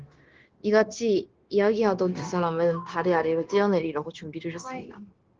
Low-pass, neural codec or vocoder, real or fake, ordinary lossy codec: 7.2 kHz; codec, 16 kHz, 0.9 kbps, LongCat-Audio-Codec; fake; Opus, 16 kbps